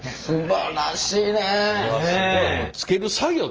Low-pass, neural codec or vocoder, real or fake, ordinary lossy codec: 7.2 kHz; none; real; Opus, 24 kbps